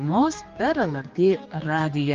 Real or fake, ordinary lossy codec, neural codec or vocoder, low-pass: fake; Opus, 32 kbps; codec, 16 kHz, 2 kbps, X-Codec, HuBERT features, trained on general audio; 7.2 kHz